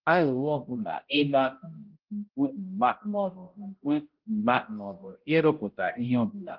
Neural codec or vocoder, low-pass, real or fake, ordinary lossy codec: codec, 16 kHz, 0.5 kbps, X-Codec, HuBERT features, trained on balanced general audio; 5.4 kHz; fake; Opus, 16 kbps